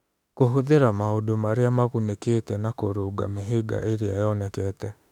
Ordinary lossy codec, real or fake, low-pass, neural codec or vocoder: none; fake; 19.8 kHz; autoencoder, 48 kHz, 32 numbers a frame, DAC-VAE, trained on Japanese speech